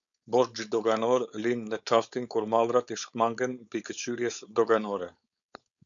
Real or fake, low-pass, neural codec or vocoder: fake; 7.2 kHz; codec, 16 kHz, 4.8 kbps, FACodec